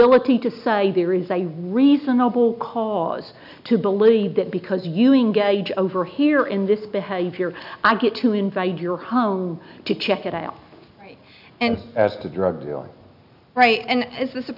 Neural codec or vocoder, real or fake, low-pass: none; real; 5.4 kHz